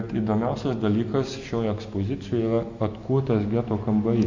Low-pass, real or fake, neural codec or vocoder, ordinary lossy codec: 7.2 kHz; real; none; MP3, 48 kbps